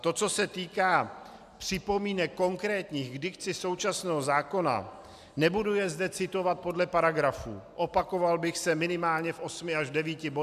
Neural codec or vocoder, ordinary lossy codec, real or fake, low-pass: none; AAC, 96 kbps; real; 14.4 kHz